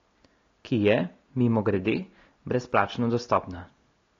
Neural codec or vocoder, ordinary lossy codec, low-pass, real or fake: none; AAC, 32 kbps; 7.2 kHz; real